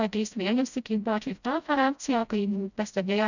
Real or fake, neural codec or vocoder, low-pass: fake; codec, 16 kHz, 0.5 kbps, FreqCodec, smaller model; 7.2 kHz